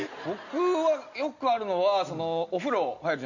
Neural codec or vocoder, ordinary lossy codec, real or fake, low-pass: none; Opus, 64 kbps; real; 7.2 kHz